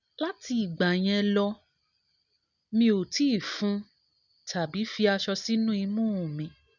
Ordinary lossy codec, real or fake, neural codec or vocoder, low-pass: none; real; none; 7.2 kHz